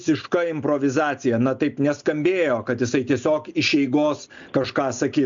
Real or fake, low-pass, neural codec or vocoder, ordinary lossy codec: real; 7.2 kHz; none; MP3, 64 kbps